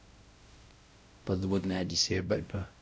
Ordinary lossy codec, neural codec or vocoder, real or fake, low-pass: none; codec, 16 kHz, 0.5 kbps, X-Codec, WavLM features, trained on Multilingual LibriSpeech; fake; none